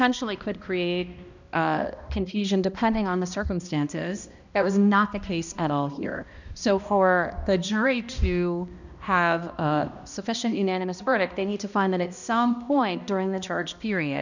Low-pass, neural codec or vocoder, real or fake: 7.2 kHz; codec, 16 kHz, 1 kbps, X-Codec, HuBERT features, trained on balanced general audio; fake